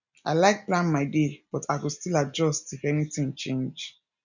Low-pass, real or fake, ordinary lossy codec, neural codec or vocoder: 7.2 kHz; real; none; none